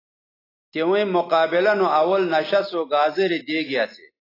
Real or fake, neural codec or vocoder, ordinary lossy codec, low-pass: real; none; AAC, 24 kbps; 5.4 kHz